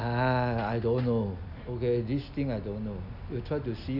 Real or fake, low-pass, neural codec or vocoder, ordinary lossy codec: real; 5.4 kHz; none; none